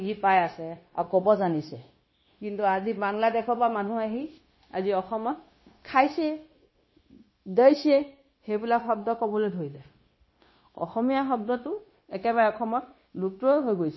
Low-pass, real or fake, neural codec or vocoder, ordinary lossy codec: 7.2 kHz; fake; codec, 16 kHz, 0.9 kbps, LongCat-Audio-Codec; MP3, 24 kbps